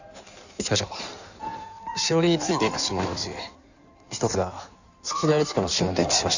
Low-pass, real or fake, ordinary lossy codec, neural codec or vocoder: 7.2 kHz; fake; none; codec, 16 kHz in and 24 kHz out, 1.1 kbps, FireRedTTS-2 codec